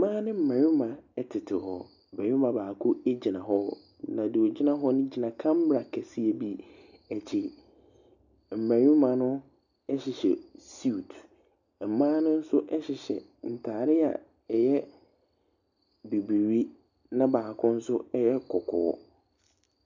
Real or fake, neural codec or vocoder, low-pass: real; none; 7.2 kHz